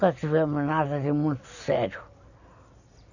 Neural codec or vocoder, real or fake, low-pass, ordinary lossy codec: none; real; 7.2 kHz; none